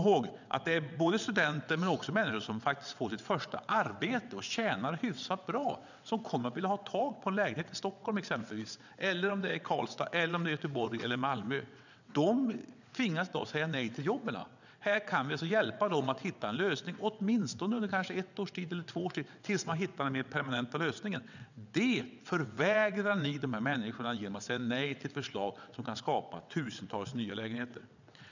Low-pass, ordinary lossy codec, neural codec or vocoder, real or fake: 7.2 kHz; none; vocoder, 22.05 kHz, 80 mel bands, WaveNeXt; fake